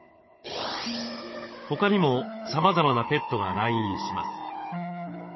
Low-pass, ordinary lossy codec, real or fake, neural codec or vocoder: 7.2 kHz; MP3, 24 kbps; fake; codec, 16 kHz, 16 kbps, FunCodec, trained on Chinese and English, 50 frames a second